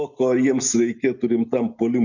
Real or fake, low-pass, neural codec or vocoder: real; 7.2 kHz; none